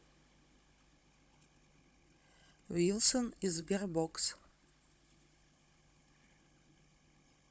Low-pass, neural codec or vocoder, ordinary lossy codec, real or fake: none; codec, 16 kHz, 16 kbps, FunCodec, trained on Chinese and English, 50 frames a second; none; fake